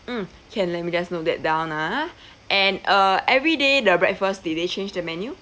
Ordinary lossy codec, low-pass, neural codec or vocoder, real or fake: none; none; none; real